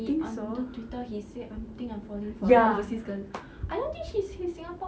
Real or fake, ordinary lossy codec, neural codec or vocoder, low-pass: real; none; none; none